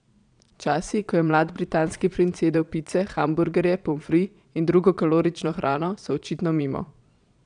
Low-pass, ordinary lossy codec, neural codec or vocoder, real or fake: 9.9 kHz; none; vocoder, 22.05 kHz, 80 mel bands, Vocos; fake